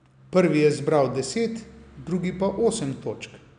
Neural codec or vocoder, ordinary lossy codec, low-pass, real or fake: none; none; 9.9 kHz; real